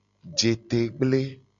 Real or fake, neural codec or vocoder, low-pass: real; none; 7.2 kHz